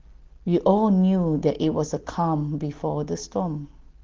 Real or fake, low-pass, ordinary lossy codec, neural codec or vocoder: real; 7.2 kHz; Opus, 16 kbps; none